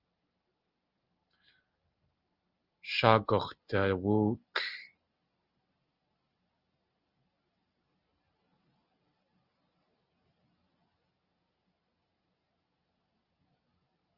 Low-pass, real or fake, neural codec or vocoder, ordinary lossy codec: 5.4 kHz; real; none; Opus, 24 kbps